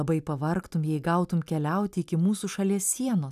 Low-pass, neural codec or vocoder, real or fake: 14.4 kHz; none; real